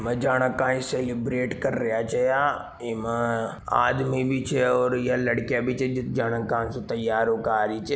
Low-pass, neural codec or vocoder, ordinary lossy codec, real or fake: none; none; none; real